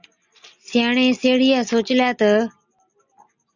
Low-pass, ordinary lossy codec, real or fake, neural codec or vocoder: 7.2 kHz; Opus, 64 kbps; real; none